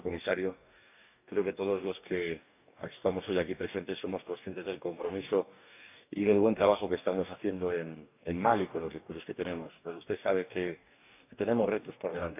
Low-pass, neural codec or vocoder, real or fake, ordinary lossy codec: 3.6 kHz; codec, 44.1 kHz, 2.6 kbps, DAC; fake; none